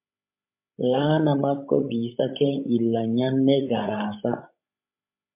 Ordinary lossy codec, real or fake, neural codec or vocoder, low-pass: MP3, 32 kbps; fake; codec, 16 kHz, 16 kbps, FreqCodec, larger model; 3.6 kHz